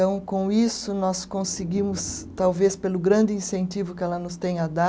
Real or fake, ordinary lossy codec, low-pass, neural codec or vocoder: real; none; none; none